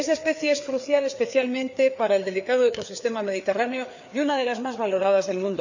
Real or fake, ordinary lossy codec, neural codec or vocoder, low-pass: fake; none; codec, 16 kHz, 4 kbps, FreqCodec, larger model; 7.2 kHz